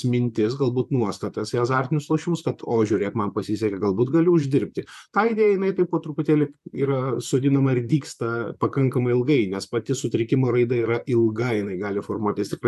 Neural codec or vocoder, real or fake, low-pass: vocoder, 44.1 kHz, 128 mel bands, Pupu-Vocoder; fake; 14.4 kHz